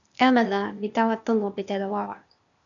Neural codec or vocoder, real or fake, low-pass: codec, 16 kHz, 0.8 kbps, ZipCodec; fake; 7.2 kHz